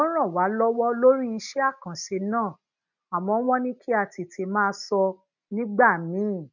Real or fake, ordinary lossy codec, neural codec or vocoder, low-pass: real; none; none; 7.2 kHz